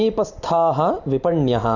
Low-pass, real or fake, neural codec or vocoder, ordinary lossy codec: 7.2 kHz; real; none; Opus, 64 kbps